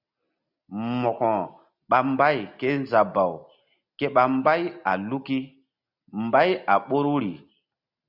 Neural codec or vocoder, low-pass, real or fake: none; 5.4 kHz; real